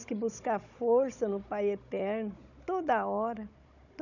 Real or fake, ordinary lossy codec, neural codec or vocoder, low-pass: fake; none; codec, 16 kHz, 16 kbps, FunCodec, trained on Chinese and English, 50 frames a second; 7.2 kHz